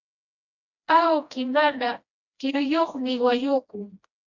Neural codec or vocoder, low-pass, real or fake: codec, 16 kHz, 1 kbps, FreqCodec, smaller model; 7.2 kHz; fake